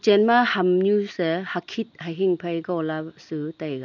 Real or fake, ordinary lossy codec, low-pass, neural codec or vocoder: real; none; 7.2 kHz; none